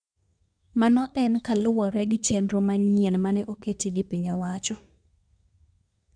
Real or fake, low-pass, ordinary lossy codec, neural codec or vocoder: fake; 9.9 kHz; none; codec, 24 kHz, 1 kbps, SNAC